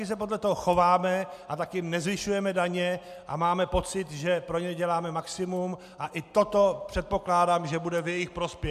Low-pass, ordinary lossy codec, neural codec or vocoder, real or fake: 14.4 kHz; MP3, 96 kbps; none; real